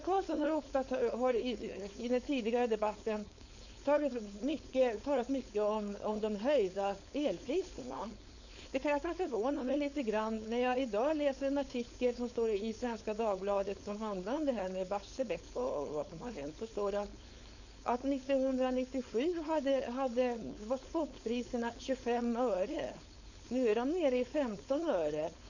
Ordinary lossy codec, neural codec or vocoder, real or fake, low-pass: none; codec, 16 kHz, 4.8 kbps, FACodec; fake; 7.2 kHz